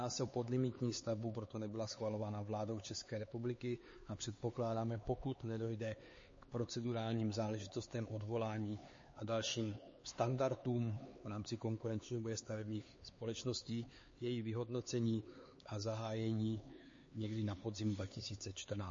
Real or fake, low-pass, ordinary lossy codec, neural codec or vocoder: fake; 7.2 kHz; MP3, 32 kbps; codec, 16 kHz, 4 kbps, X-Codec, HuBERT features, trained on LibriSpeech